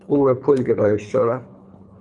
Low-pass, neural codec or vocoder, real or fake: 10.8 kHz; codec, 24 kHz, 3 kbps, HILCodec; fake